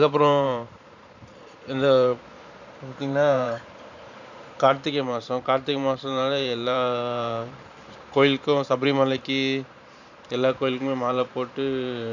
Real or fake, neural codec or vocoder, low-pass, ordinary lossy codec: fake; vocoder, 44.1 kHz, 128 mel bands every 512 samples, BigVGAN v2; 7.2 kHz; none